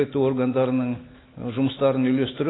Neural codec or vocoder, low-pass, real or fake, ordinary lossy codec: none; 7.2 kHz; real; AAC, 16 kbps